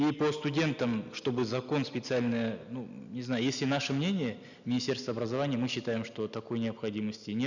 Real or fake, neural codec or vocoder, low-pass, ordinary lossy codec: real; none; 7.2 kHz; none